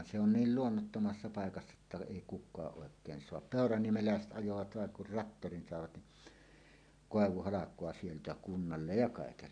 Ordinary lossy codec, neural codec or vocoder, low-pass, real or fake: none; none; 9.9 kHz; real